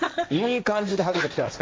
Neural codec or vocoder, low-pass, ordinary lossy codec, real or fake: codec, 16 kHz, 1.1 kbps, Voila-Tokenizer; none; none; fake